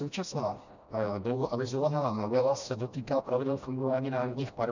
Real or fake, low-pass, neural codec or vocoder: fake; 7.2 kHz; codec, 16 kHz, 1 kbps, FreqCodec, smaller model